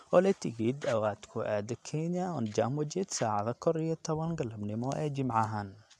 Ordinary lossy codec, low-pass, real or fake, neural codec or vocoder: none; none; real; none